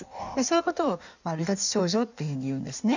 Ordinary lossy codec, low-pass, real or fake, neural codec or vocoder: none; 7.2 kHz; fake; codec, 16 kHz in and 24 kHz out, 1.1 kbps, FireRedTTS-2 codec